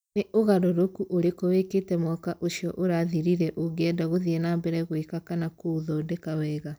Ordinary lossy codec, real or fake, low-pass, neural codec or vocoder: none; real; none; none